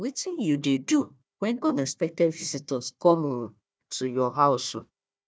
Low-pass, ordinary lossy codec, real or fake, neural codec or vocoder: none; none; fake; codec, 16 kHz, 1 kbps, FunCodec, trained on Chinese and English, 50 frames a second